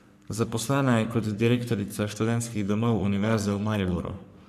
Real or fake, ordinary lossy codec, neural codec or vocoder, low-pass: fake; none; codec, 44.1 kHz, 3.4 kbps, Pupu-Codec; 14.4 kHz